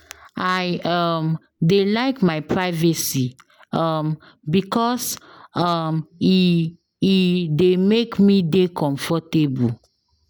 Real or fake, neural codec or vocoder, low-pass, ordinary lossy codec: real; none; 19.8 kHz; none